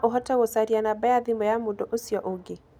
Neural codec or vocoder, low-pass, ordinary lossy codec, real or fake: none; 19.8 kHz; none; real